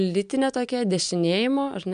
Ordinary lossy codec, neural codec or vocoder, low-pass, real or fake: MP3, 96 kbps; none; 9.9 kHz; real